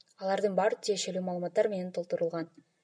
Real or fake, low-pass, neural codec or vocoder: real; 9.9 kHz; none